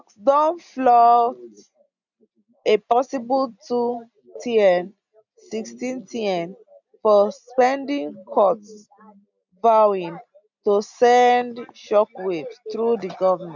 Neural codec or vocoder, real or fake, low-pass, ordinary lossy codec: none; real; 7.2 kHz; none